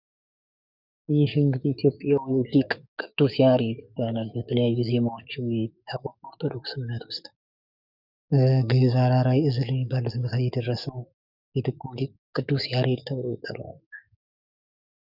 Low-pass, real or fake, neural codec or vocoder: 5.4 kHz; fake; codec, 44.1 kHz, 7.8 kbps, DAC